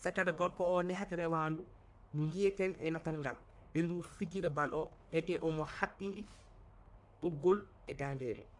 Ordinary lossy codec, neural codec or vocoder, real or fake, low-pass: AAC, 64 kbps; codec, 44.1 kHz, 1.7 kbps, Pupu-Codec; fake; 10.8 kHz